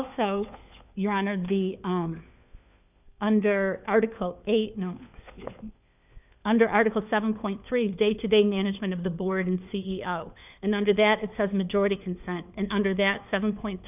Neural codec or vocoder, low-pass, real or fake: codec, 16 kHz, 4 kbps, FunCodec, trained on LibriTTS, 50 frames a second; 3.6 kHz; fake